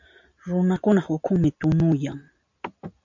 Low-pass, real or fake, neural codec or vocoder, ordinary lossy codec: 7.2 kHz; real; none; MP3, 64 kbps